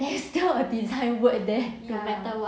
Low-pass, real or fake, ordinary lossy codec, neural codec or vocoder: none; real; none; none